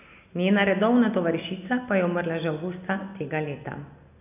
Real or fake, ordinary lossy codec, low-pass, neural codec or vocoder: real; none; 3.6 kHz; none